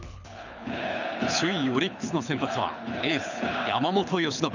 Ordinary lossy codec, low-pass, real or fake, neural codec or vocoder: none; 7.2 kHz; fake; codec, 24 kHz, 6 kbps, HILCodec